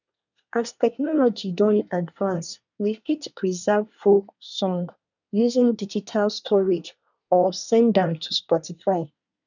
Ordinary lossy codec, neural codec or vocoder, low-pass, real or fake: none; codec, 24 kHz, 1 kbps, SNAC; 7.2 kHz; fake